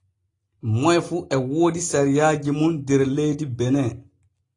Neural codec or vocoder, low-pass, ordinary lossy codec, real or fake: none; 10.8 kHz; AAC, 32 kbps; real